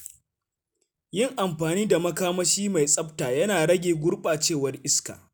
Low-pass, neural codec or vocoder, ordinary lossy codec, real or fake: none; none; none; real